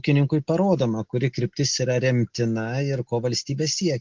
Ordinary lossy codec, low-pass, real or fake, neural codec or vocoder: Opus, 16 kbps; 7.2 kHz; real; none